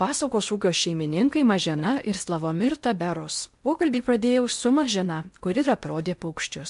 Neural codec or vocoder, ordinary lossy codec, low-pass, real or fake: codec, 16 kHz in and 24 kHz out, 0.8 kbps, FocalCodec, streaming, 65536 codes; MP3, 64 kbps; 10.8 kHz; fake